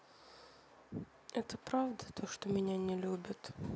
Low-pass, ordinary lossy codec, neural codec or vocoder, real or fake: none; none; none; real